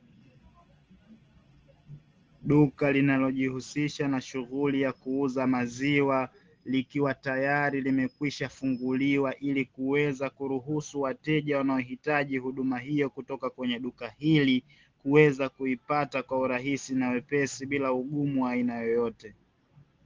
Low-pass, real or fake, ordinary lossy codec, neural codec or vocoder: 7.2 kHz; real; Opus, 16 kbps; none